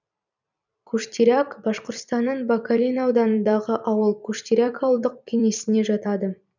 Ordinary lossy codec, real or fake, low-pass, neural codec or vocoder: none; fake; 7.2 kHz; vocoder, 44.1 kHz, 128 mel bands every 256 samples, BigVGAN v2